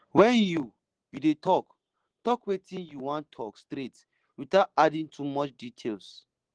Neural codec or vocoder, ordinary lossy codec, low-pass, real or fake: none; Opus, 16 kbps; 9.9 kHz; real